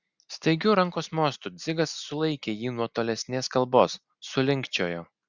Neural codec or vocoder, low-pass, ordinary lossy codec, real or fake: none; 7.2 kHz; Opus, 64 kbps; real